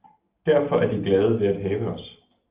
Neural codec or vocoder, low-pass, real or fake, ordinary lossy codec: none; 3.6 kHz; real; Opus, 32 kbps